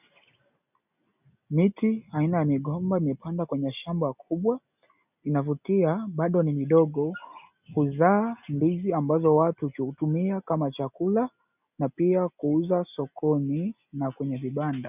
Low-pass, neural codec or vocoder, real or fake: 3.6 kHz; none; real